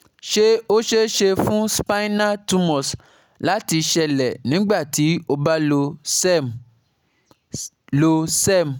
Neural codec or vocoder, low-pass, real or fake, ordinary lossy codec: none; none; real; none